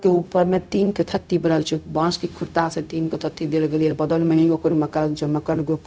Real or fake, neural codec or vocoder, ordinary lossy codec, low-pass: fake; codec, 16 kHz, 0.4 kbps, LongCat-Audio-Codec; none; none